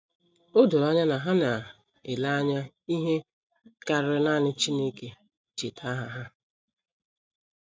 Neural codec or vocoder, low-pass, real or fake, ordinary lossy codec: none; none; real; none